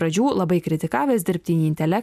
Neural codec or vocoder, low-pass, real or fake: none; 14.4 kHz; real